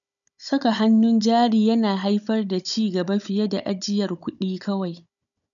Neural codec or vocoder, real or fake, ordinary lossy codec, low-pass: codec, 16 kHz, 16 kbps, FunCodec, trained on Chinese and English, 50 frames a second; fake; none; 7.2 kHz